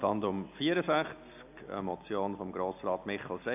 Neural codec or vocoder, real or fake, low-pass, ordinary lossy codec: none; real; 3.6 kHz; AAC, 32 kbps